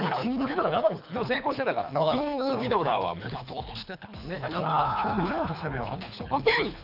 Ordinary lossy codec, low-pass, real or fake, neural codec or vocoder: none; 5.4 kHz; fake; codec, 24 kHz, 3 kbps, HILCodec